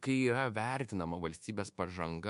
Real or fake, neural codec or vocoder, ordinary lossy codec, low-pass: fake; codec, 24 kHz, 1.2 kbps, DualCodec; MP3, 64 kbps; 10.8 kHz